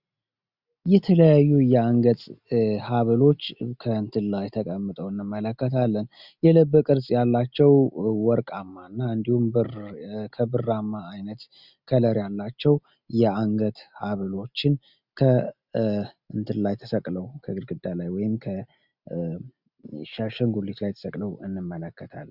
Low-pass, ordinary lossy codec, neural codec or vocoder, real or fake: 5.4 kHz; Opus, 64 kbps; none; real